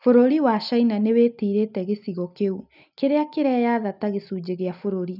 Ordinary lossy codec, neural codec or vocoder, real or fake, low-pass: none; none; real; 5.4 kHz